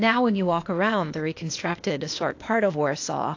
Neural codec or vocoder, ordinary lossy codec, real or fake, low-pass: codec, 16 kHz, 0.8 kbps, ZipCodec; AAC, 48 kbps; fake; 7.2 kHz